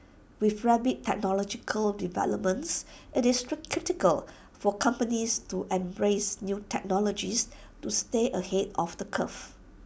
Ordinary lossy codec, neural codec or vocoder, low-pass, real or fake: none; none; none; real